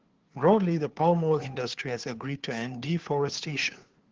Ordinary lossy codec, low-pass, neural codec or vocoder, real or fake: Opus, 16 kbps; 7.2 kHz; codec, 16 kHz, 2 kbps, FunCodec, trained on Chinese and English, 25 frames a second; fake